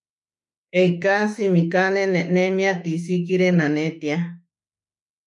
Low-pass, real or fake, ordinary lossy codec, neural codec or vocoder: 10.8 kHz; fake; MP3, 64 kbps; autoencoder, 48 kHz, 32 numbers a frame, DAC-VAE, trained on Japanese speech